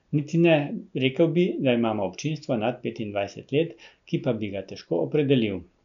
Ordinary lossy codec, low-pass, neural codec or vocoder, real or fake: none; 7.2 kHz; none; real